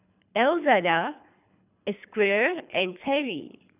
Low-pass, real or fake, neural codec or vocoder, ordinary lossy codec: 3.6 kHz; fake; codec, 24 kHz, 3 kbps, HILCodec; none